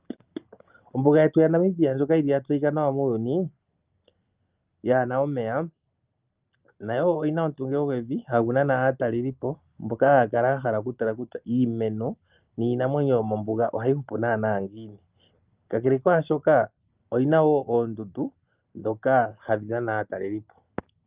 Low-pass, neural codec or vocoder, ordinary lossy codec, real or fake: 3.6 kHz; none; Opus, 24 kbps; real